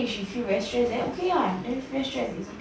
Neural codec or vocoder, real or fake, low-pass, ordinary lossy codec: none; real; none; none